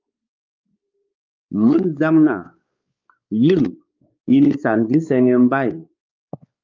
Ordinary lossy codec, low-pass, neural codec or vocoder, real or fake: Opus, 24 kbps; 7.2 kHz; codec, 16 kHz, 4 kbps, X-Codec, WavLM features, trained on Multilingual LibriSpeech; fake